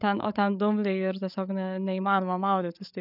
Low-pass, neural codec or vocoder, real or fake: 5.4 kHz; codec, 16 kHz, 8 kbps, FreqCodec, larger model; fake